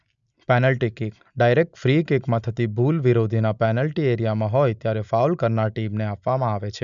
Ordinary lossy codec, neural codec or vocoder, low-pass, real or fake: none; none; 7.2 kHz; real